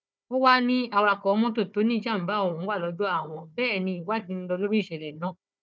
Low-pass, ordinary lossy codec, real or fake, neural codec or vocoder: none; none; fake; codec, 16 kHz, 4 kbps, FunCodec, trained on Chinese and English, 50 frames a second